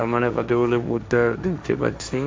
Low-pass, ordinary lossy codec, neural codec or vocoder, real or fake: none; none; codec, 16 kHz, 1.1 kbps, Voila-Tokenizer; fake